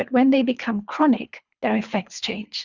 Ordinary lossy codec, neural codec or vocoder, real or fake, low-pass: Opus, 64 kbps; codec, 24 kHz, 3 kbps, HILCodec; fake; 7.2 kHz